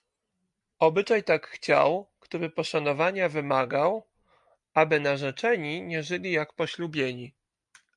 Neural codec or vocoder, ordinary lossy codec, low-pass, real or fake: none; MP3, 64 kbps; 10.8 kHz; real